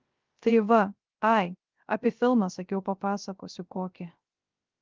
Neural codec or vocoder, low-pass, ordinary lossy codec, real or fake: codec, 16 kHz, 0.3 kbps, FocalCodec; 7.2 kHz; Opus, 32 kbps; fake